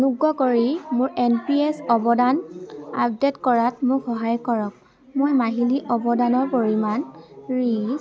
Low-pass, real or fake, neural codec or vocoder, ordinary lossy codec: none; real; none; none